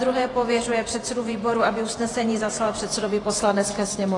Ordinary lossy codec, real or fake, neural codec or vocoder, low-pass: AAC, 32 kbps; fake; vocoder, 48 kHz, 128 mel bands, Vocos; 10.8 kHz